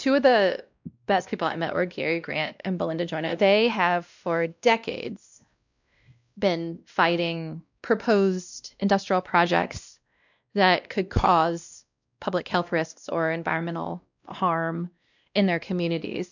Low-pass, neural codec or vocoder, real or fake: 7.2 kHz; codec, 16 kHz, 1 kbps, X-Codec, WavLM features, trained on Multilingual LibriSpeech; fake